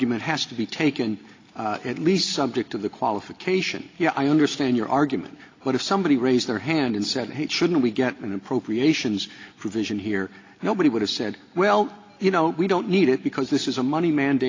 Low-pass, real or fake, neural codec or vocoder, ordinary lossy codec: 7.2 kHz; real; none; AAC, 32 kbps